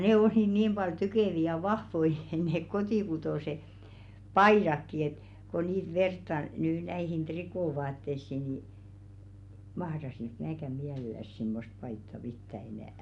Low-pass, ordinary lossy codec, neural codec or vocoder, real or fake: 10.8 kHz; none; none; real